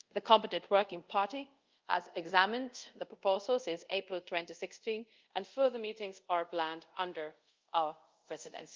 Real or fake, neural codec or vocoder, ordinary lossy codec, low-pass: fake; codec, 24 kHz, 0.5 kbps, DualCodec; Opus, 24 kbps; 7.2 kHz